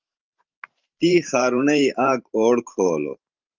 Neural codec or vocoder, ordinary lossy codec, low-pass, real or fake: vocoder, 44.1 kHz, 128 mel bands every 512 samples, BigVGAN v2; Opus, 32 kbps; 7.2 kHz; fake